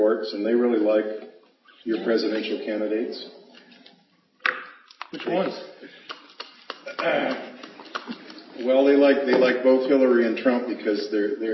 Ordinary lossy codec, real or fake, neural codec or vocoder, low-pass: MP3, 24 kbps; real; none; 7.2 kHz